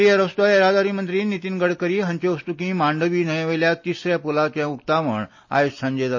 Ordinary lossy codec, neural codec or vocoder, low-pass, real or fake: MP3, 32 kbps; none; 7.2 kHz; real